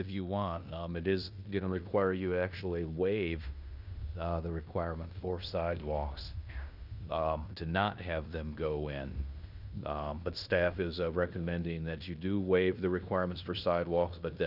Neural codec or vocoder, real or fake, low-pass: codec, 16 kHz in and 24 kHz out, 0.9 kbps, LongCat-Audio-Codec, fine tuned four codebook decoder; fake; 5.4 kHz